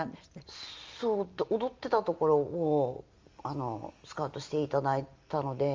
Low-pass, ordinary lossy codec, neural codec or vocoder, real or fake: 7.2 kHz; Opus, 32 kbps; none; real